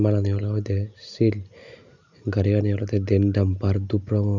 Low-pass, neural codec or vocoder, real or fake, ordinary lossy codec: 7.2 kHz; none; real; none